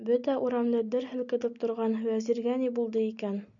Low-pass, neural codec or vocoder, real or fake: 7.2 kHz; none; real